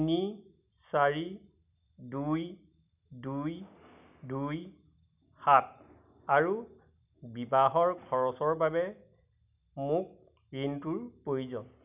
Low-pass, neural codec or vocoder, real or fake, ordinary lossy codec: 3.6 kHz; none; real; none